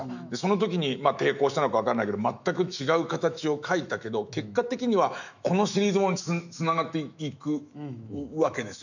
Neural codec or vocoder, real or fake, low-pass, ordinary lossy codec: autoencoder, 48 kHz, 128 numbers a frame, DAC-VAE, trained on Japanese speech; fake; 7.2 kHz; none